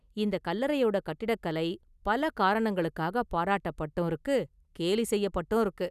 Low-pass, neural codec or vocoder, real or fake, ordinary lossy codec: 14.4 kHz; none; real; none